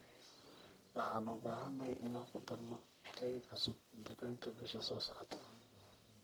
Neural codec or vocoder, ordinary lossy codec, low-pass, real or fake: codec, 44.1 kHz, 1.7 kbps, Pupu-Codec; none; none; fake